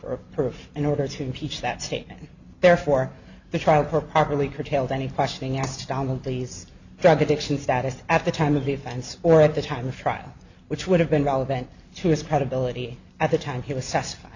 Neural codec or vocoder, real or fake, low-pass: none; real; 7.2 kHz